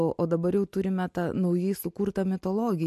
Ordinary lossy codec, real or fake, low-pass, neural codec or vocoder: MP3, 64 kbps; real; 14.4 kHz; none